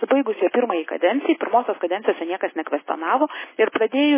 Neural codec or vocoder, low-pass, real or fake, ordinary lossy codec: none; 3.6 kHz; real; MP3, 16 kbps